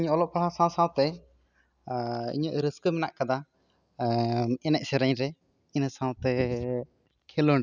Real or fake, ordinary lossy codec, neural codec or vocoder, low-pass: real; none; none; 7.2 kHz